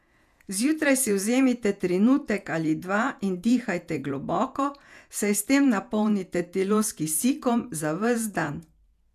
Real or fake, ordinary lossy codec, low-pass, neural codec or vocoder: fake; none; 14.4 kHz; vocoder, 48 kHz, 128 mel bands, Vocos